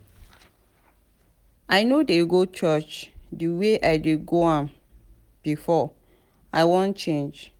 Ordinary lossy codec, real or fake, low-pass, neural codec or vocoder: Opus, 24 kbps; real; 19.8 kHz; none